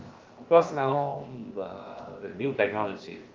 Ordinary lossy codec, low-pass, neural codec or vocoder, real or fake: Opus, 32 kbps; 7.2 kHz; codec, 16 kHz, 0.7 kbps, FocalCodec; fake